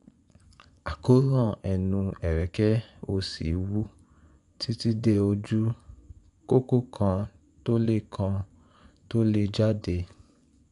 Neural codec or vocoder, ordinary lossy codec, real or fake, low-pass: vocoder, 24 kHz, 100 mel bands, Vocos; none; fake; 10.8 kHz